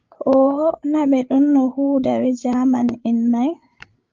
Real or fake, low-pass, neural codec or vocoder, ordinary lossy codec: fake; 7.2 kHz; codec, 16 kHz, 8 kbps, FreqCodec, larger model; Opus, 24 kbps